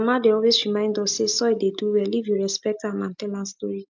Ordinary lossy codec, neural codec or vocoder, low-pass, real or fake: MP3, 64 kbps; none; 7.2 kHz; real